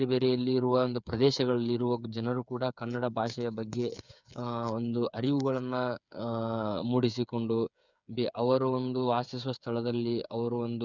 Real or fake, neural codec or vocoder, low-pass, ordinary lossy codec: fake; codec, 16 kHz, 8 kbps, FreqCodec, smaller model; 7.2 kHz; none